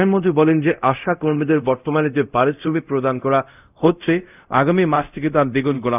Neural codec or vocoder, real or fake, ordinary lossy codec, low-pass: codec, 24 kHz, 0.5 kbps, DualCodec; fake; none; 3.6 kHz